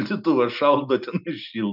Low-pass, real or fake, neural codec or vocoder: 5.4 kHz; real; none